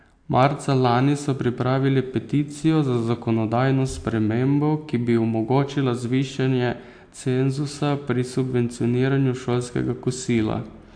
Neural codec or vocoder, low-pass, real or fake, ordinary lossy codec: none; 9.9 kHz; real; AAC, 64 kbps